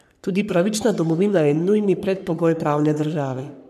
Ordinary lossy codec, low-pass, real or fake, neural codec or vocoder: none; 14.4 kHz; fake; codec, 44.1 kHz, 3.4 kbps, Pupu-Codec